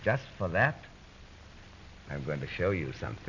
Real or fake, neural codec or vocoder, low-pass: real; none; 7.2 kHz